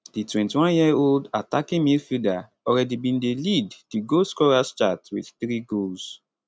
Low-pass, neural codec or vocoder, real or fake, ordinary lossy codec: none; none; real; none